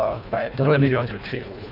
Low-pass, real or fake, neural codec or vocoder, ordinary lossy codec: 5.4 kHz; fake; codec, 24 kHz, 1.5 kbps, HILCodec; none